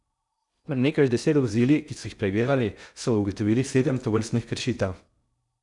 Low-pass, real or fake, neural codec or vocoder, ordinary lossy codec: 10.8 kHz; fake; codec, 16 kHz in and 24 kHz out, 0.6 kbps, FocalCodec, streaming, 2048 codes; none